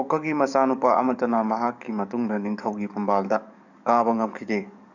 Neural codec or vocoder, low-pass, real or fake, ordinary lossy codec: codec, 44.1 kHz, 7.8 kbps, DAC; 7.2 kHz; fake; none